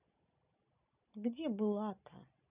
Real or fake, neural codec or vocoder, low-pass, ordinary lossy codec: real; none; 3.6 kHz; none